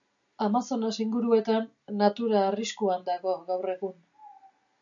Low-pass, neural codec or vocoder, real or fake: 7.2 kHz; none; real